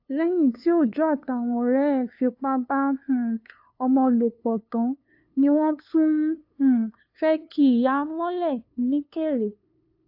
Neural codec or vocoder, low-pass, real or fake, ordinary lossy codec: codec, 16 kHz, 2 kbps, FunCodec, trained on LibriTTS, 25 frames a second; 5.4 kHz; fake; AAC, 48 kbps